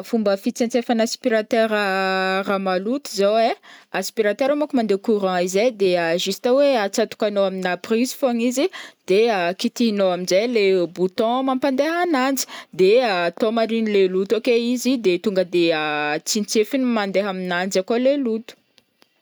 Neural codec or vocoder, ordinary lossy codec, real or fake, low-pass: none; none; real; none